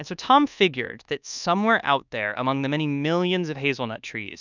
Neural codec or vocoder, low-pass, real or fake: codec, 24 kHz, 1.2 kbps, DualCodec; 7.2 kHz; fake